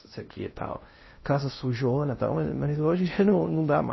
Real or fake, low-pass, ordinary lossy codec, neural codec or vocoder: fake; 7.2 kHz; MP3, 24 kbps; codec, 16 kHz in and 24 kHz out, 0.6 kbps, FocalCodec, streaming, 2048 codes